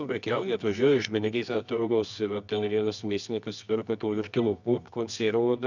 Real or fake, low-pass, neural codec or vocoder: fake; 7.2 kHz; codec, 24 kHz, 0.9 kbps, WavTokenizer, medium music audio release